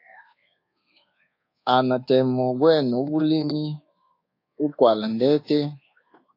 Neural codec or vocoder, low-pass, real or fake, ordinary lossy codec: codec, 24 kHz, 1.2 kbps, DualCodec; 5.4 kHz; fake; AAC, 32 kbps